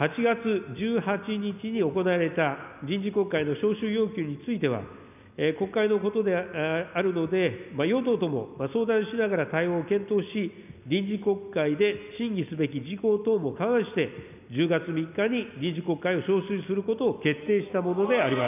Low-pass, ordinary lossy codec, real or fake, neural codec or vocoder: 3.6 kHz; none; real; none